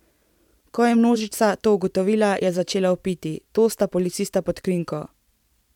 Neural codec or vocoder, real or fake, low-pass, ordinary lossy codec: vocoder, 44.1 kHz, 128 mel bands every 512 samples, BigVGAN v2; fake; 19.8 kHz; none